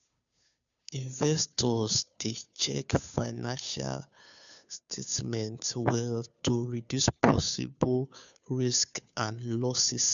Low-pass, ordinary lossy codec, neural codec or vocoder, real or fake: 7.2 kHz; none; codec, 16 kHz, 2 kbps, FunCodec, trained on Chinese and English, 25 frames a second; fake